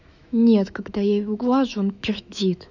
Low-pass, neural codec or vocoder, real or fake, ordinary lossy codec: 7.2 kHz; autoencoder, 48 kHz, 128 numbers a frame, DAC-VAE, trained on Japanese speech; fake; none